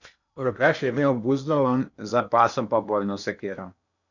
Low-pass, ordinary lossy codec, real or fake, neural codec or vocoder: 7.2 kHz; none; fake; codec, 16 kHz in and 24 kHz out, 0.8 kbps, FocalCodec, streaming, 65536 codes